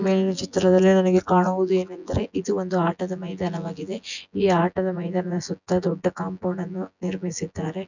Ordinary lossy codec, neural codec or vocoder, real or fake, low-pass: none; vocoder, 24 kHz, 100 mel bands, Vocos; fake; 7.2 kHz